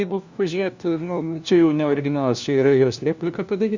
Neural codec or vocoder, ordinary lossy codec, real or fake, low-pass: codec, 16 kHz, 1 kbps, FunCodec, trained on LibriTTS, 50 frames a second; Opus, 64 kbps; fake; 7.2 kHz